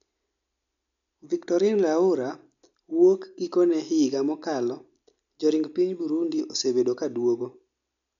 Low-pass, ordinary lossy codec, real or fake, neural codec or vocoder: 7.2 kHz; none; real; none